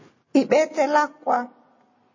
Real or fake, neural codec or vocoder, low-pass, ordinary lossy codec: real; none; 7.2 kHz; MP3, 32 kbps